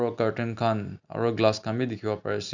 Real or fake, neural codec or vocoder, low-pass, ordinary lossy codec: real; none; 7.2 kHz; none